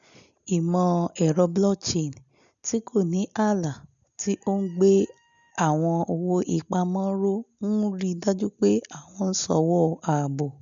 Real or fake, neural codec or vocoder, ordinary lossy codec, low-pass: real; none; none; 7.2 kHz